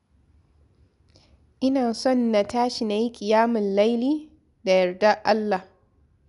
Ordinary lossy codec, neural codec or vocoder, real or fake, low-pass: MP3, 96 kbps; none; real; 10.8 kHz